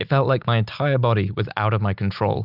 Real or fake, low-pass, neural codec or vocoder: real; 5.4 kHz; none